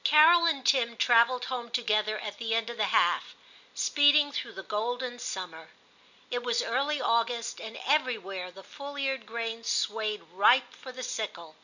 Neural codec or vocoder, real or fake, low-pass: none; real; 7.2 kHz